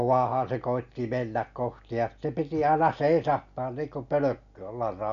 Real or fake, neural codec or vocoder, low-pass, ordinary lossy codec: real; none; 7.2 kHz; none